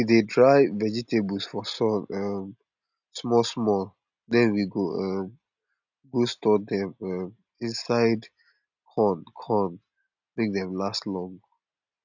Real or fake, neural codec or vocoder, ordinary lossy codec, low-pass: real; none; none; 7.2 kHz